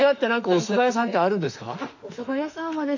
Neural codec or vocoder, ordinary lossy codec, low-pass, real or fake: autoencoder, 48 kHz, 32 numbers a frame, DAC-VAE, trained on Japanese speech; none; 7.2 kHz; fake